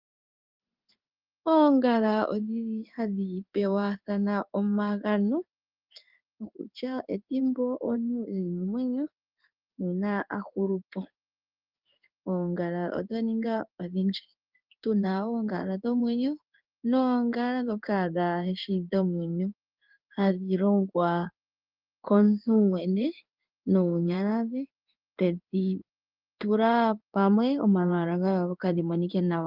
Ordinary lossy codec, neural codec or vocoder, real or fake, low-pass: Opus, 24 kbps; codec, 16 kHz in and 24 kHz out, 1 kbps, XY-Tokenizer; fake; 5.4 kHz